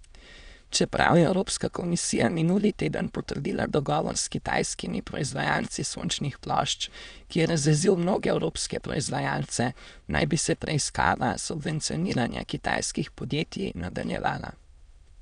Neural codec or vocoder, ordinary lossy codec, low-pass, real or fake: autoencoder, 22.05 kHz, a latent of 192 numbers a frame, VITS, trained on many speakers; none; 9.9 kHz; fake